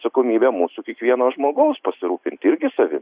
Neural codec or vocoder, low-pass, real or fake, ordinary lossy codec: none; 3.6 kHz; real; Opus, 64 kbps